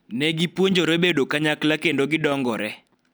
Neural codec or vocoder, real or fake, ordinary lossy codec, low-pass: none; real; none; none